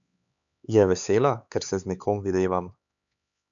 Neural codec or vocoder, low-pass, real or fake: codec, 16 kHz, 4 kbps, X-Codec, HuBERT features, trained on LibriSpeech; 7.2 kHz; fake